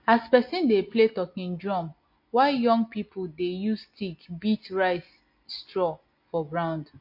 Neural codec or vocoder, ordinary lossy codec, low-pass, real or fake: none; MP3, 32 kbps; 5.4 kHz; real